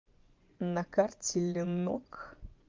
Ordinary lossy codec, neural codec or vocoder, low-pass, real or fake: Opus, 32 kbps; vocoder, 24 kHz, 100 mel bands, Vocos; 7.2 kHz; fake